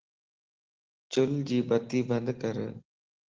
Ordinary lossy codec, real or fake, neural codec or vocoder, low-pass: Opus, 16 kbps; real; none; 7.2 kHz